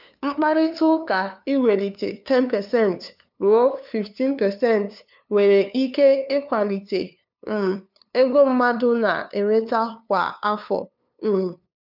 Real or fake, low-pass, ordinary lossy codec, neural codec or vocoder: fake; 5.4 kHz; none; codec, 16 kHz, 2 kbps, FunCodec, trained on LibriTTS, 25 frames a second